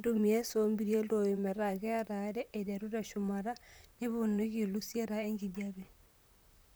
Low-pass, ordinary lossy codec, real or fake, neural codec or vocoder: none; none; fake; vocoder, 44.1 kHz, 128 mel bands, Pupu-Vocoder